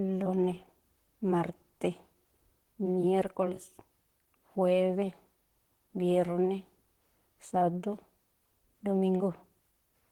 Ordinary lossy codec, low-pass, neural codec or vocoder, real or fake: Opus, 16 kbps; 19.8 kHz; vocoder, 44.1 kHz, 128 mel bands, Pupu-Vocoder; fake